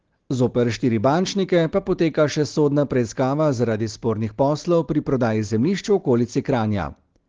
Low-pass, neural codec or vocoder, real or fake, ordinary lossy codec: 7.2 kHz; none; real; Opus, 16 kbps